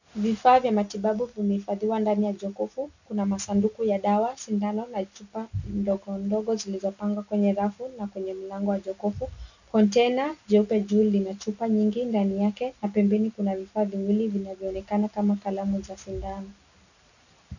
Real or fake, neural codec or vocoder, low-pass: real; none; 7.2 kHz